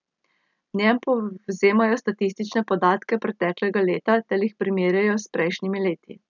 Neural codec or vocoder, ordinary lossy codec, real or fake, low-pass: none; none; real; 7.2 kHz